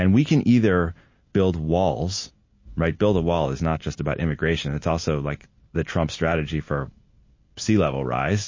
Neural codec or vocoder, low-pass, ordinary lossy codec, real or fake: none; 7.2 kHz; MP3, 32 kbps; real